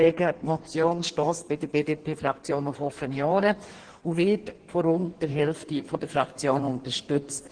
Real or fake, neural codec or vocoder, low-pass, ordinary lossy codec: fake; codec, 16 kHz in and 24 kHz out, 1.1 kbps, FireRedTTS-2 codec; 9.9 kHz; Opus, 16 kbps